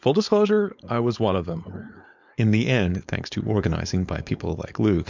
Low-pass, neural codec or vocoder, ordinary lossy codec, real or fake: 7.2 kHz; codec, 16 kHz, 4.8 kbps, FACodec; MP3, 64 kbps; fake